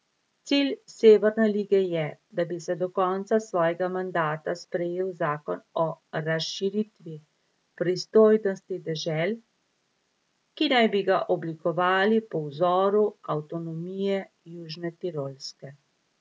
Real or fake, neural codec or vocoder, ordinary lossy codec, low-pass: real; none; none; none